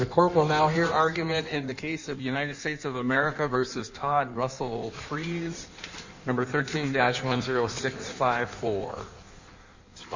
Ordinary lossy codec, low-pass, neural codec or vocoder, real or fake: Opus, 64 kbps; 7.2 kHz; codec, 16 kHz in and 24 kHz out, 1.1 kbps, FireRedTTS-2 codec; fake